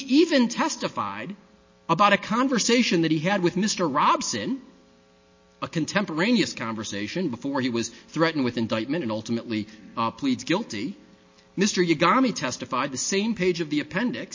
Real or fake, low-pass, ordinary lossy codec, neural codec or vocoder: real; 7.2 kHz; MP3, 32 kbps; none